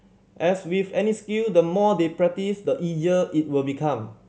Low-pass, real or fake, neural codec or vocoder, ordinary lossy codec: none; real; none; none